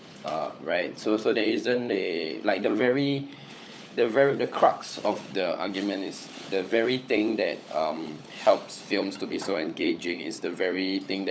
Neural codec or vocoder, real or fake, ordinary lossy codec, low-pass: codec, 16 kHz, 16 kbps, FunCodec, trained on LibriTTS, 50 frames a second; fake; none; none